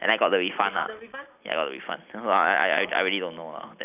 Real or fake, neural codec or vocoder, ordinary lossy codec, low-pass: fake; vocoder, 44.1 kHz, 128 mel bands every 256 samples, BigVGAN v2; none; 3.6 kHz